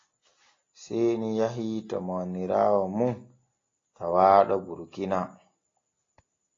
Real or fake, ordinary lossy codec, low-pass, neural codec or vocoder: real; AAC, 32 kbps; 7.2 kHz; none